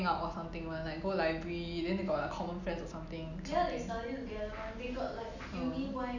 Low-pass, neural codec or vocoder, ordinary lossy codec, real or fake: 7.2 kHz; none; none; real